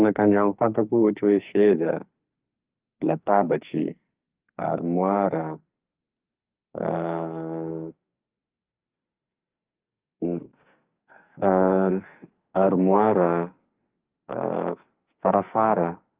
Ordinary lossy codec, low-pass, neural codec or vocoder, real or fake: Opus, 32 kbps; 3.6 kHz; codec, 44.1 kHz, 2.6 kbps, SNAC; fake